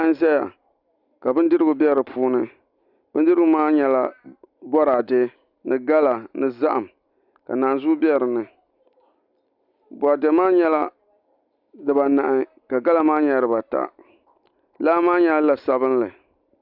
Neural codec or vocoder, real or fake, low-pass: none; real; 5.4 kHz